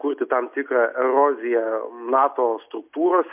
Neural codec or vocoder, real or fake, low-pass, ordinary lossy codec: none; real; 3.6 kHz; MP3, 32 kbps